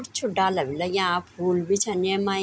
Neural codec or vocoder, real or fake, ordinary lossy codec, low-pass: none; real; none; none